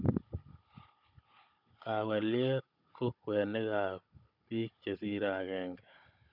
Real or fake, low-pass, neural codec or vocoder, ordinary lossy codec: fake; 5.4 kHz; codec, 16 kHz, 16 kbps, FunCodec, trained on Chinese and English, 50 frames a second; none